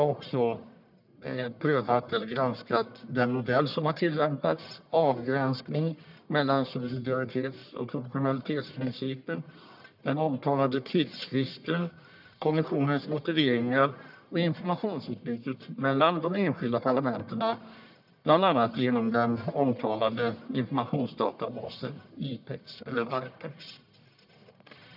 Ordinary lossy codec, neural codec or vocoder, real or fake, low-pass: none; codec, 44.1 kHz, 1.7 kbps, Pupu-Codec; fake; 5.4 kHz